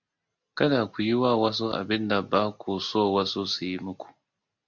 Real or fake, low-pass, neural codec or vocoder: real; 7.2 kHz; none